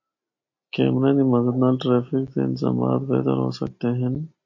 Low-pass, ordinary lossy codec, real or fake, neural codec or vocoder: 7.2 kHz; MP3, 32 kbps; real; none